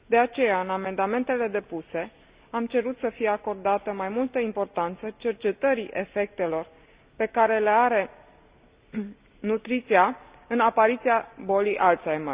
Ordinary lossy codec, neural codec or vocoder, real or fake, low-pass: Opus, 64 kbps; none; real; 3.6 kHz